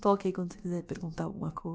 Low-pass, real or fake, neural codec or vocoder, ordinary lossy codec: none; fake; codec, 16 kHz, about 1 kbps, DyCAST, with the encoder's durations; none